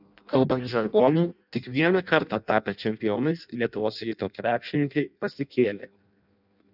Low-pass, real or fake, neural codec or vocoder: 5.4 kHz; fake; codec, 16 kHz in and 24 kHz out, 0.6 kbps, FireRedTTS-2 codec